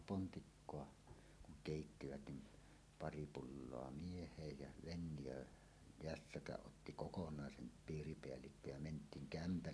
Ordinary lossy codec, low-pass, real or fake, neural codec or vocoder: none; none; real; none